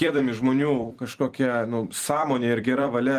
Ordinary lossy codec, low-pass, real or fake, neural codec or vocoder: Opus, 24 kbps; 14.4 kHz; fake; vocoder, 44.1 kHz, 128 mel bands every 256 samples, BigVGAN v2